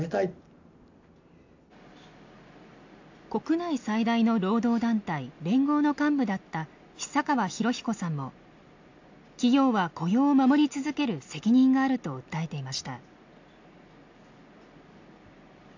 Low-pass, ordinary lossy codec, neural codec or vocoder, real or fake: 7.2 kHz; AAC, 48 kbps; none; real